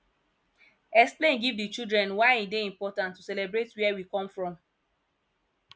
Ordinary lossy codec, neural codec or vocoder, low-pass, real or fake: none; none; none; real